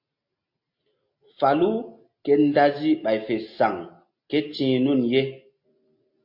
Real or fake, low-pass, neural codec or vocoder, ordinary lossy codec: real; 5.4 kHz; none; MP3, 32 kbps